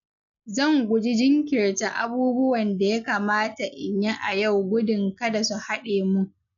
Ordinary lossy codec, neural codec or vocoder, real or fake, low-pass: none; none; real; 7.2 kHz